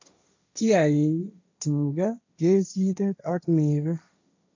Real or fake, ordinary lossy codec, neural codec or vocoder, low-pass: fake; AAC, 48 kbps; codec, 16 kHz, 1.1 kbps, Voila-Tokenizer; 7.2 kHz